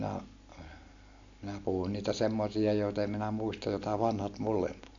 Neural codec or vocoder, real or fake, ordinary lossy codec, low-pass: none; real; AAC, 48 kbps; 7.2 kHz